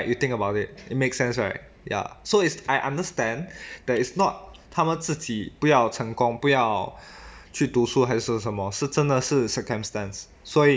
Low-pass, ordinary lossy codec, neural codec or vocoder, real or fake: none; none; none; real